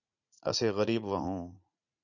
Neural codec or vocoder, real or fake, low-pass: vocoder, 44.1 kHz, 80 mel bands, Vocos; fake; 7.2 kHz